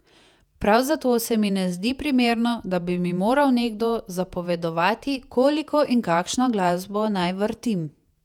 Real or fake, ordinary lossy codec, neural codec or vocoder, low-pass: fake; none; vocoder, 48 kHz, 128 mel bands, Vocos; 19.8 kHz